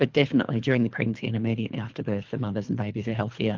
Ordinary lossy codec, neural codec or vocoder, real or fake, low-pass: Opus, 24 kbps; codec, 24 kHz, 3 kbps, HILCodec; fake; 7.2 kHz